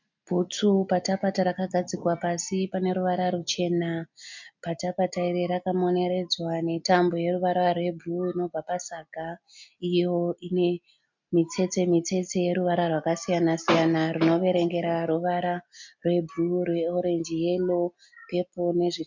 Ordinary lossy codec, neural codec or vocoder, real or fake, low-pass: MP3, 64 kbps; none; real; 7.2 kHz